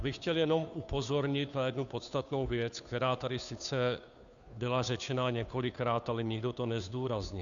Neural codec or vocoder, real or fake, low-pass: codec, 16 kHz, 2 kbps, FunCodec, trained on Chinese and English, 25 frames a second; fake; 7.2 kHz